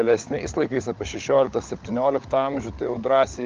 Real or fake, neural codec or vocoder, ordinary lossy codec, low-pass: fake; codec, 16 kHz, 16 kbps, FunCodec, trained on LibriTTS, 50 frames a second; Opus, 24 kbps; 7.2 kHz